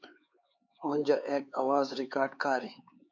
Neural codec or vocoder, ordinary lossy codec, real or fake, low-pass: codec, 16 kHz, 4 kbps, X-Codec, HuBERT features, trained on LibriSpeech; MP3, 48 kbps; fake; 7.2 kHz